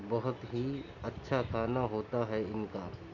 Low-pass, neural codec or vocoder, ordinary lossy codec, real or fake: 7.2 kHz; none; none; real